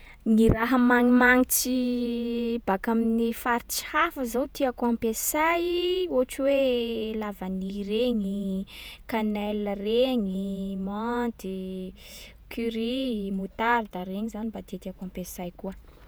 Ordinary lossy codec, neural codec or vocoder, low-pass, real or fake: none; vocoder, 48 kHz, 128 mel bands, Vocos; none; fake